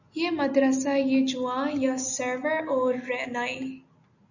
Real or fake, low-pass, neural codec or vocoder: real; 7.2 kHz; none